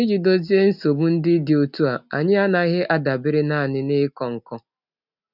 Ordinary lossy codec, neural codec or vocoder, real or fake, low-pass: none; none; real; 5.4 kHz